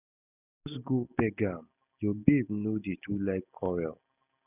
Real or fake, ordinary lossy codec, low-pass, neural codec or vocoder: real; none; 3.6 kHz; none